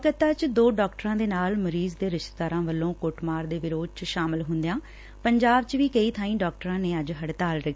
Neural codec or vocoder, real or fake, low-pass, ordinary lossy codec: none; real; none; none